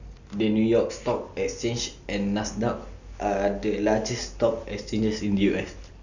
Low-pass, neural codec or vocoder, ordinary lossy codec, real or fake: 7.2 kHz; none; none; real